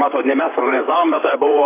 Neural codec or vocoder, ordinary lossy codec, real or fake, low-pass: vocoder, 44.1 kHz, 128 mel bands, Pupu-Vocoder; AAC, 16 kbps; fake; 3.6 kHz